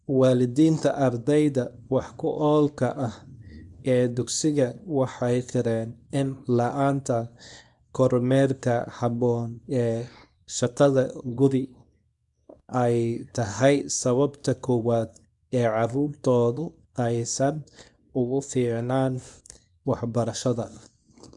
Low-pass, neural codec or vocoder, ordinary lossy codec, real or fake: 10.8 kHz; codec, 24 kHz, 0.9 kbps, WavTokenizer, small release; none; fake